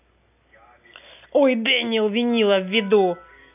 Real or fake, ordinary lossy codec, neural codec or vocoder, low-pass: real; none; none; 3.6 kHz